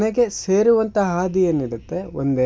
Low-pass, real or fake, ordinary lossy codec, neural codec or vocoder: none; real; none; none